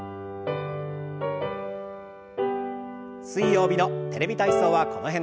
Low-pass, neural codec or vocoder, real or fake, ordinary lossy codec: none; none; real; none